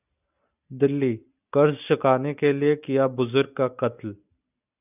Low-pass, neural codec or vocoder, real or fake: 3.6 kHz; none; real